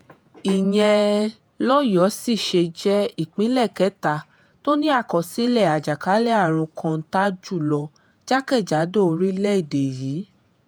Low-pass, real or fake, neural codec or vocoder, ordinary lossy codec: none; fake; vocoder, 48 kHz, 128 mel bands, Vocos; none